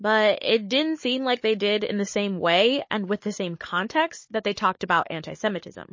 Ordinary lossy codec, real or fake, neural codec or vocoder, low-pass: MP3, 32 kbps; fake; codec, 16 kHz, 16 kbps, FunCodec, trained on Chinese and English, 50 frames a second; 7.2 kHz